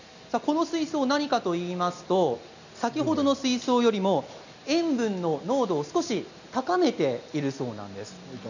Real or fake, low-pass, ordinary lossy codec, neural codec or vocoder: real; 7.2 kHz; none; none